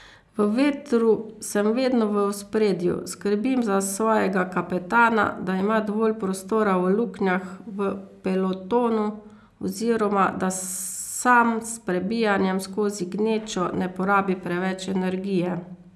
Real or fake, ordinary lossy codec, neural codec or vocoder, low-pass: real; none; none; none